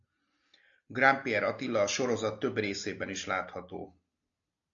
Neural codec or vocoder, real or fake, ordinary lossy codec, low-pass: none; real; AAC, 64 kbps; 7.2 kHz